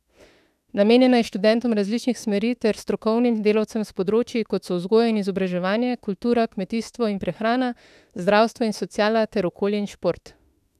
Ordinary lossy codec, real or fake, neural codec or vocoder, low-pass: none; fake; autoencoder, 48 kHz, 32 numbers a frame, DAC-VAE, trained on Japanese speech; 14.4 kHz